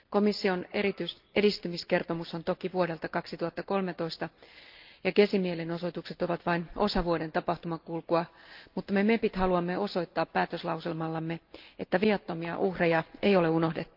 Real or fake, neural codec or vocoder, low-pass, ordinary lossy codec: real; none; 5.4 kHz; Opus, 24 kbps